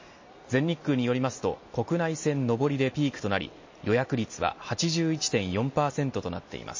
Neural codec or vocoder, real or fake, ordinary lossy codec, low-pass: none; real; MP3, 32 kbps; 7.2 kHz